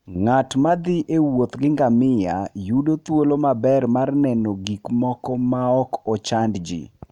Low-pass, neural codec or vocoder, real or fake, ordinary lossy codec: 19.8 kHz; none; real; none